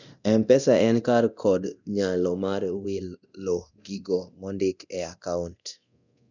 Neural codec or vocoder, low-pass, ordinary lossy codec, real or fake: codec, 24 kHz, 0.9 kbps, DualCodec; 7.2 kHz; none; fake